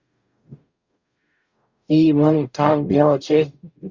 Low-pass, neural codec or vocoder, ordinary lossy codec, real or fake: 7.2 kHz; codec, 44.1 kHz, 0.9 kbps, DAC; Opus, 64 kbps; fake